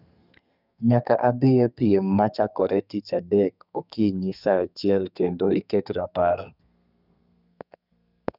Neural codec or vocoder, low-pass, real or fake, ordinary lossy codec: codec, 32 kHz, 1.9 kbps, SNAC; 5.4 kHz; fake; none